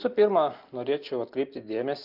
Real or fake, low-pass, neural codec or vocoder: real; 5.4 kHz; none